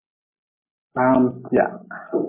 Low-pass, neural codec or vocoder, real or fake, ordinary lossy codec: 3.6 kHz; none; real; MP3, 16 kbps